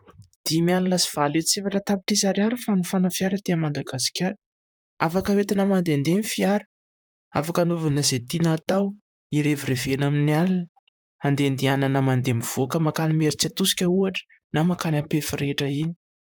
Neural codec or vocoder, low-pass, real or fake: vocoder, 44.1 kHz, 128 mel bands, Pupu-Vocoder; 19.8 kHz; fake